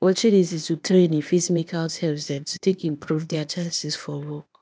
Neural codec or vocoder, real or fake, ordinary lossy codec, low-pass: codec, 16 kHz, 0.8 kbps, ZipCodec; fake; none; none